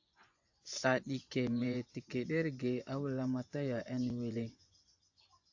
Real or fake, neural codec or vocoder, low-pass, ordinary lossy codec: fake; vocoder, 24 kHz, 100 mel bands, Vocos; 7.2 kHz; AAC, 48 kbps